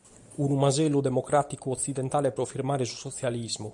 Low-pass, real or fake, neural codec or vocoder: 10.8 kHz; real; none